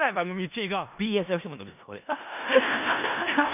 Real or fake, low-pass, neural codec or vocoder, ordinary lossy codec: fake; 3.6 kHz; codec, 16 kHz in and 24 kHz out, 0.4 kbps, LongCat-Audio-Codec, four codebook decoder; none